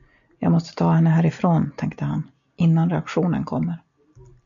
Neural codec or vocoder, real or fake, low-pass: none; real; 7.2 kHz